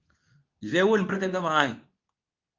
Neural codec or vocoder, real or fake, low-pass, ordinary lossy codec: codec, 24 kHz, 0.9 kbps, WavTokenizer, medium speech release version 2; fake; 7.2 kHz; Opus, 24 kbps